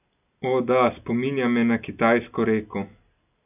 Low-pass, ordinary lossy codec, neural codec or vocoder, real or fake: 3.6 kHz; none; none; real